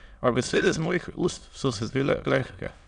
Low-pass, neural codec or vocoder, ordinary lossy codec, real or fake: 9.9 kHz; autoencoder, 22.05 kHz, a latent of 192 numbers a frame, VITS, trained on many speakers; none; fake